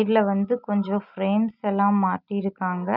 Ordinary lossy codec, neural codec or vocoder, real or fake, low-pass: none; none; real; 5.4 kHz